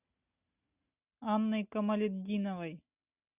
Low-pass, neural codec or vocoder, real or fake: 3.6 kHz; none; real